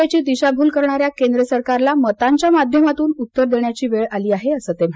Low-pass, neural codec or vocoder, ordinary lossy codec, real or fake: none; none; none; real